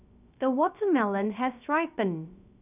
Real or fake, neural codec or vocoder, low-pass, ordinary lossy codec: fake; codec, 16 kHz, 0.3 kbps, FocalCodec; 3.6 kHz; none